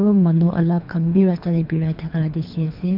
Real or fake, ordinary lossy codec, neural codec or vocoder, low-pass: fake; MP3, 48 kbps; codec, 24 kHz, 3 kbps, HILCodec; 5.4 kHz